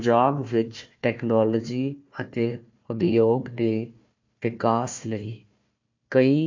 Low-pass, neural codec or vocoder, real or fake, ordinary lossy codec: 7.2 kHz; codec, 16 kHz, 1 kbps, FunCodec, trained on Chinese and English, 50 frames a second; fake; MP3, 48 kbps